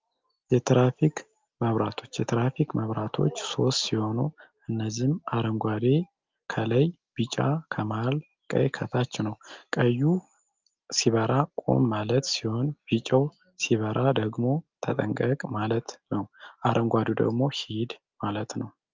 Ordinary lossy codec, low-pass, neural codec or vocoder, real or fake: Opus, 24 kbps; 7.2 kHz; none; real